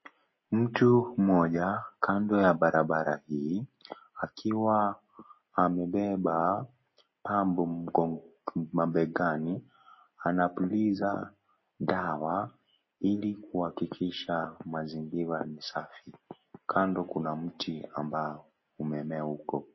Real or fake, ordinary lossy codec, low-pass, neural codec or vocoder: real; MP3, 24 kbps; 7.2 kHz; none